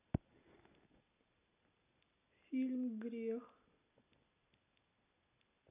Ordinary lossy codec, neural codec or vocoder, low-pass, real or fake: none; none; 3.6 kHz; real